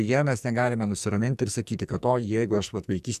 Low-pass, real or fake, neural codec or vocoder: 14.4 kHz; fake; codec, 44.1 kHz, 2.6 kbps, SNAC